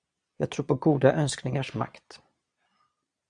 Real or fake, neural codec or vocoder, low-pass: fake; vocoder, 22.05 kHz, 80 mel bands, Vocos; 9.9 kHz